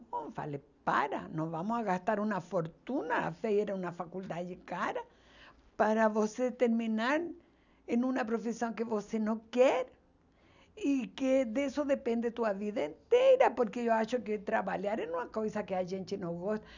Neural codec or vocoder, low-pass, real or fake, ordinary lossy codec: none; 7.2 kHz; real; none